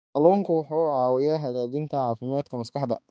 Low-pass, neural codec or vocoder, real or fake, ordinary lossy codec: none; codec, 16 kHz, 2 kbps, X-Codec, HuBERT features, trained on balanced general audio; fake; none